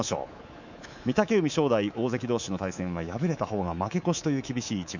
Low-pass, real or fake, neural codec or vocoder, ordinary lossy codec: 7.2 kHz; fake; codec, 24 kHz, 3.1 kbps, DualCodec; none